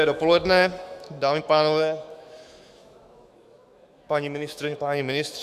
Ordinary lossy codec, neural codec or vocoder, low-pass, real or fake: Opus, 64 kbps; autoencoder, 48 kHz, 128 numbers a frame, DAC-VAE, trained on Japanese speech; 14.4 kHz; fake